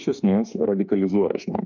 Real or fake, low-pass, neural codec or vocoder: fake; 7.2 kHz; autoencoder, 48 kHz, 32 numbers a frame, DAC-VAE, trained on Japanese speech